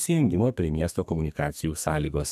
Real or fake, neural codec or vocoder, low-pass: fake; codec, 32 kHz, 1.9 kbps, SNAC; 14.4 kHz